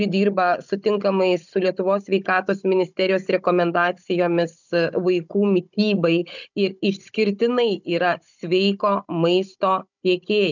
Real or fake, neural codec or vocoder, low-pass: fake; codec, 16 kHz, 16 kbps, FunCodec, trained on Chinese and English, 50 frames a second; 7.2 kHz